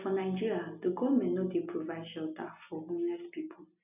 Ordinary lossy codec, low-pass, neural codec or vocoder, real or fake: none; 3.6 kHz; none; real